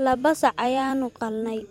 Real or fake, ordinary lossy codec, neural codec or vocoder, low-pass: fake; MP3, 64 kbps; vocoder, 44.1 kHz, 128 mel bands every 256 samples, BigVGAN v2; 19.8 kHz